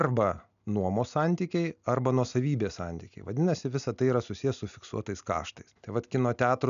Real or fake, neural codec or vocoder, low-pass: real; none; 7.2 kHz